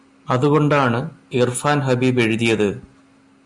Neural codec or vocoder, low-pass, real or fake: none; 10.8 kHz; real